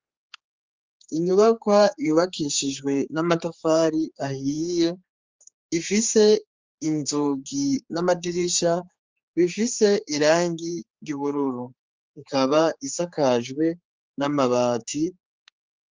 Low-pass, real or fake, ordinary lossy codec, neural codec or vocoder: 7.2 kHz; fake; Opus, 32 kbps; codec, 16 kHz, 4 kbps, X-Codec, HuBERT features, trained on general audio